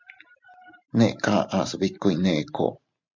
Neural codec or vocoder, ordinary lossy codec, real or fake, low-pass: vocoder, 44.1 kHz, 128 mel bands every 512 samples, BigVGAN v2; MP3, 64 kbps; fake; 7.2 kHz